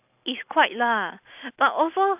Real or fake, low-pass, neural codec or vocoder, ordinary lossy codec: real; 3.6 kHz; none; AAC, 32 kbps